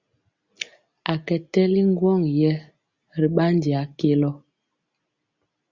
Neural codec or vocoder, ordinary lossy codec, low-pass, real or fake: none; Opus, 64 kbps; 7.2 kHz; real